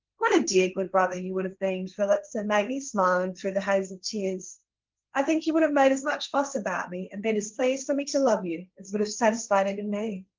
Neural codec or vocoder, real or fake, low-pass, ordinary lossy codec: codec, 16 kHz, 1.1 kbps, Voila-Tokenizer; fake; 7.2 kHz; Opus, 24 kbps